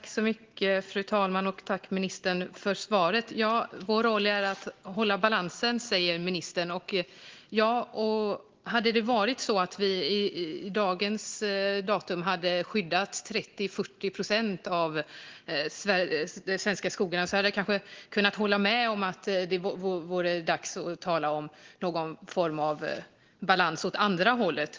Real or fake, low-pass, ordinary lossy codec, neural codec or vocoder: real; 7.2 kHz; Opus, 16 kbps; none